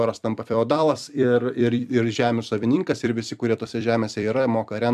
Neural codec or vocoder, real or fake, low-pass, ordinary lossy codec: vocoder, 44.1 kHz, 128 mel bands every 256 samples, BigVGAN v2; fake; 14.4 kHz; AAC, 96 kbps